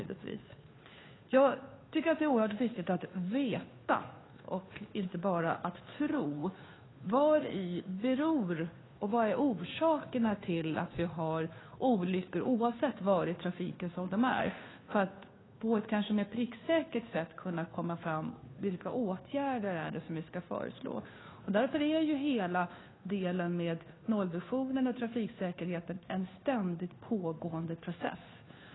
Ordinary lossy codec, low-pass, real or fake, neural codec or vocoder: AAC, 16 kbps; 7.2 kHz; fake; codec, 16 kHz, 2 kbps, FunCodec, trained on Chinese and English, 25 frames a second